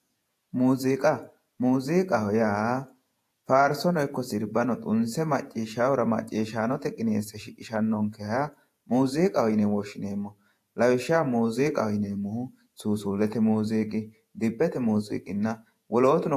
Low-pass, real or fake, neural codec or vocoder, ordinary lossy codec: 14.4 kHz; fake; vocoder, 48 kHz, 128 mel bands, Vocos; AAC, 64 kbps